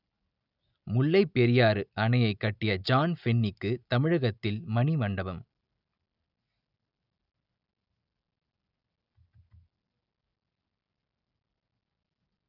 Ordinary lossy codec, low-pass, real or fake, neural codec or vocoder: none; 5.4 kHz; real; none